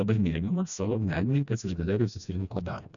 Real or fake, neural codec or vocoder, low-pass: fake; codec, 16 kHz, 1 kbps, FreqCodec, smaller model; 7.2 kHz